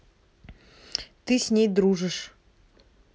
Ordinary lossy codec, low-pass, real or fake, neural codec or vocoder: none; none; real; none